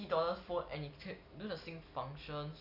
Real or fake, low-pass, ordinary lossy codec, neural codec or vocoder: real; 5.4 kHz; none; none